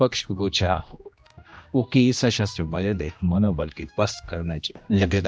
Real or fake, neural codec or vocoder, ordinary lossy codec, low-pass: fake; codec, 16 kHz, 1 kbps, X-Codec, HuBERT features, trained on general audio; none; none